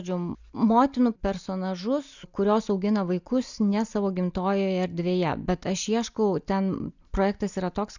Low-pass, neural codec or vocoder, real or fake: 7.2 kHz; none; real